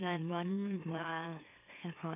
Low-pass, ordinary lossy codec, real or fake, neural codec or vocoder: 3.6 kHz; none; fake; autoencoder, 44.1 kHz, a latent of 192 numbers a frame, MeloTTS